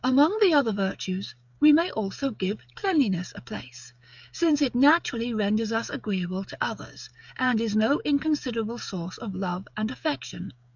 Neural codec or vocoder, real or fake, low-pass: codec, 16 kHz, 16 kbps, FreqCodec, smaller model; fake; 7.2 kHz